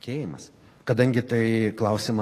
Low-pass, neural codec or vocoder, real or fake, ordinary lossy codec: 14.4 kHz; codec, 44.1 kHz, 7.8 kbps, DAC; fake; AAC, 48 kbps